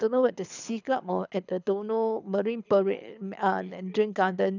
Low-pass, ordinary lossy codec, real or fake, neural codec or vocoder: 7.2 kHz; none; fake; codec, 24 kHz, 6 kbps, HILCodec